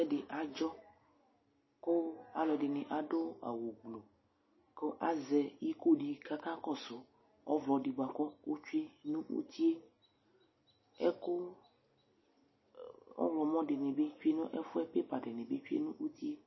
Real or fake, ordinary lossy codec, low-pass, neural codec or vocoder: real; MP3, 24 kbps; 7.2 kHz; none